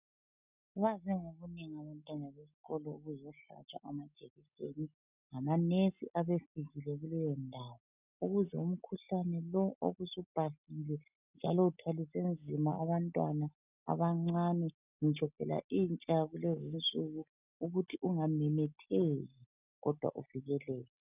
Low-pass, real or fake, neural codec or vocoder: 3.6 kHz; real; none